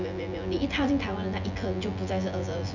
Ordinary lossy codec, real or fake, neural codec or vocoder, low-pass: none; fake; vocoder, 24 kHz, 100 mel bands, Vocos; 7.2 kHz